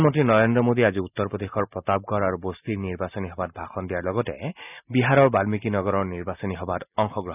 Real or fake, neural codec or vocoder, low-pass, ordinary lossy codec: real; none; 3.6 kHz; none